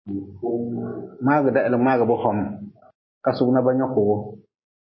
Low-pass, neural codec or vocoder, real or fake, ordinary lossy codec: 7.2 kHz; none; real; MP3, 24 kbps